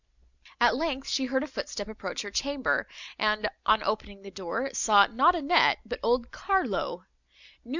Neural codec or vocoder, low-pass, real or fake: none; 7.2 kHz; real